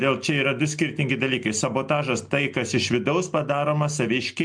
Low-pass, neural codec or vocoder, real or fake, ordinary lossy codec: 9.9 kHz; none; real; MP3, 64 kbps